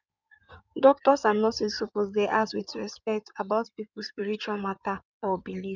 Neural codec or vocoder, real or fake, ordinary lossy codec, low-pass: codec, 16 kHz in and 24 kHz out, 2.2 kbps, FireRedTTS-2 codec; fake; none; 7.2 kHz